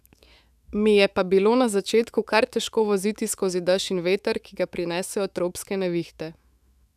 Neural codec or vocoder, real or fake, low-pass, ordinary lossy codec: autoencoder, 48 kHz, 128 numbers a frame, DAC-VAE, trained on Japanese speech; fake; 14.4 kHz; none